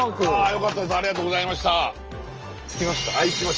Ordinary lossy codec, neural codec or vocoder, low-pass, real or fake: Opus, 24 kbps; none; 7.2 kHz; real